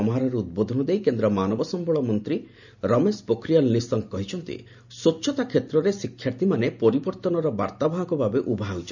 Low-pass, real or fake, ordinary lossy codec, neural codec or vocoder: 7.2 kHz; real; none; none